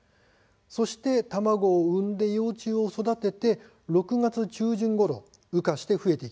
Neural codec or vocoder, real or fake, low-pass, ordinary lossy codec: none; real; none; none